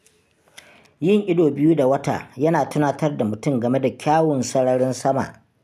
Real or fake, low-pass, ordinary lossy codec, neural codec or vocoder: real; 14.4 kHz; none; none